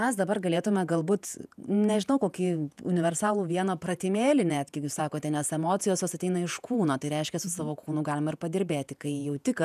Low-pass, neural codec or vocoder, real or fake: 14.4 kHz; vocoder, 48 kHz, 128 mel bands, Vocos; fake